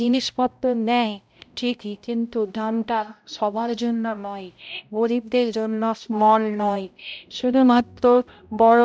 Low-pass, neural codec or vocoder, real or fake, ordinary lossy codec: none; codec, 16 kHz, 0.5 kbps, X-Codec, HuBERT features, trained on balanced general audio; fake; none